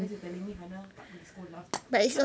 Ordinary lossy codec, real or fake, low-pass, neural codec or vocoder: none; real; none; none